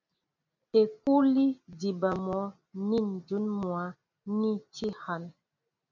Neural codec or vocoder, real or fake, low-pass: none; real; 7.2 kHz